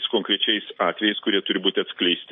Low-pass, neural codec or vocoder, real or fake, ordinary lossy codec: 9.9 kHz; none; real; MP3, 32 kbps